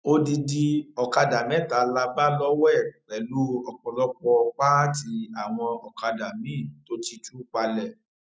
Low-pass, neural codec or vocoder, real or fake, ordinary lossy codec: none; none; real; none